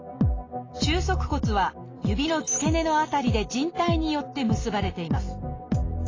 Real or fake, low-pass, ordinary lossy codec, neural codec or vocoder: real; 7.2 kHz; AAC, 32 kbps; none